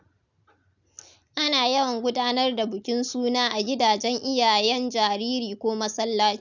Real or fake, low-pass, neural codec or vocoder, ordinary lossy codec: real; 7.2 kHz; none; none